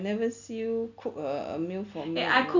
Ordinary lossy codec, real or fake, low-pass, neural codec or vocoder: none; real; 7.2 kHz; none